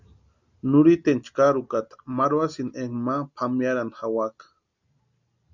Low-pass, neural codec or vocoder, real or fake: 7.2 kHz; none; real